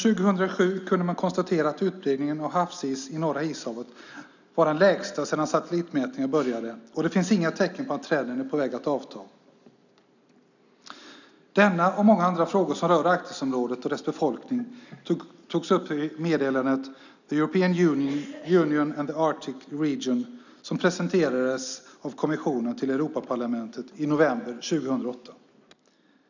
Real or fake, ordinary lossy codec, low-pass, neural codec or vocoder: real; none; 7.2 kHz; none